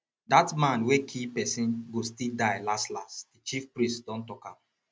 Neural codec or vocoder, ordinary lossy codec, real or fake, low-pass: none; none; real; none